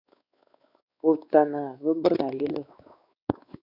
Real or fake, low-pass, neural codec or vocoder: fake; 5.4 kHz; codec, 16 kHz, 4 kbps, X-Codec, WavLM features, trained on Multilingual LibriSpeech